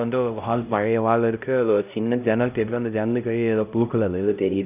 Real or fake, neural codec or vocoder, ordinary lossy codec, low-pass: fake; codec, 16 kHz, 0.5 kbps, X-Codec, HuBERT features, trained on LibriSpeech; none; 3.6 kHz